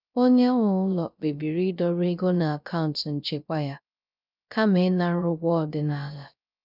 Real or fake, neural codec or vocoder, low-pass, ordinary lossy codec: fake; codec, 16 kHz, 0.3 kbps, FocalCodec; 5.4 kHz; none